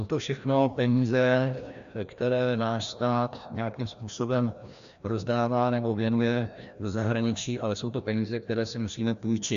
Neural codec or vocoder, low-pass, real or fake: codec, 16 kHz, 1 kbps, FreqCodec, larger model; 7.2 kHz; fake